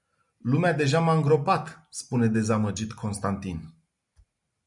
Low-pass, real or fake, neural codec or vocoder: 10.8 kHz; real; none